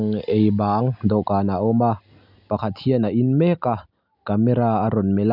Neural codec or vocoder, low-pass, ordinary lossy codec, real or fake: none; 5.4 kHz; none; real